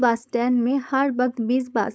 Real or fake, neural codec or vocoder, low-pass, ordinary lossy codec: fake; codec, 16 kHz, 4.8 kbps, FACodec; none; none